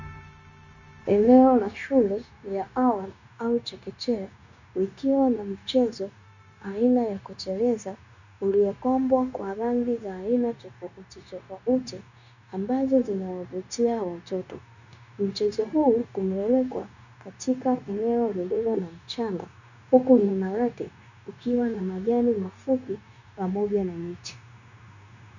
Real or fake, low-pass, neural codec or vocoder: fake; 7.2 kHz; codec, 16 kHz, 0.9 kbps, LongCat-Audio-Codec